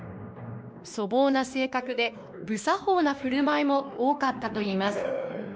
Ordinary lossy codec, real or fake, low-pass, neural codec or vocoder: none; fake; none; codec, 16 kHz, 2 kbps, X-Codec, WavLM features, trained on Multilingual LibriSpeech